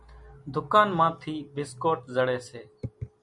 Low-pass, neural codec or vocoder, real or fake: 10.8 kHz; none; real